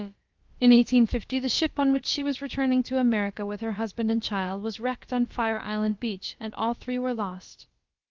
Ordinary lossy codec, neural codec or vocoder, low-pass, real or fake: Opus, 32 kbps; codec, 16 kHz, about 1 kbps, DyCAST, with the encoder's durations; 7.2 kHz; fake